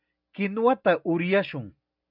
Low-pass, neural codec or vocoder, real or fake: 5.4 kHz; none; real